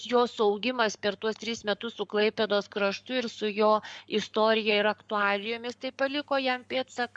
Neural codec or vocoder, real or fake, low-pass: codec, 44.1 kHz, 7.8 kbps, Pupu-Codec; fake; 9.9 kHz